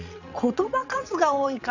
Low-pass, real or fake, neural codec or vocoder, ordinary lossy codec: 7.2 kHz; fake; vocoder, 22.05 kHz, 80 mel bands, WaveNeXt; none